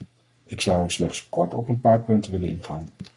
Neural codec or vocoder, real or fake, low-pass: codec, 44.1 kHz, 3.4 kbps, Pupu-Codec; fake; 10.8 kHz